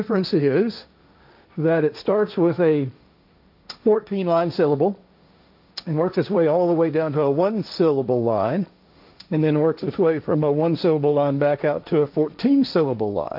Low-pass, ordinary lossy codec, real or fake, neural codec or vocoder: 5.4 kHz; AAC, 48 kbps; fake; codec, 16 kHz, 1.1 kbps, Voila-Tokenizer